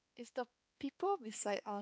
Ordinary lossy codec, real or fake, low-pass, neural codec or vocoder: none; fake; none; codec, 16 kHz, 4 kbps, X-Codec, HuBERT features, trained on balanced general audio